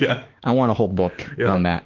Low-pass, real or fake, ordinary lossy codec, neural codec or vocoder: 7.2 kHz; fake; Opus, 16 kbps; autoencoder, 48 kHz, 32 numbers a frame, DAC-VAE, trained on Japanese speech